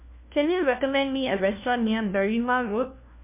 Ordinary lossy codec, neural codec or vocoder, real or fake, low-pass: MP3, 32 kbps; codec, 16 kHz, 1 kbps, FunCodec, trained on LibriTTS, 50 frames a second; fake; 3.6 kHz